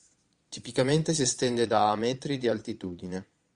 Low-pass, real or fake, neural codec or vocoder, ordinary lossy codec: 9.9 kHz; fake; vocoder, 22.05 kHz, 80 mel bands, Vocos; Opus, 64 kbps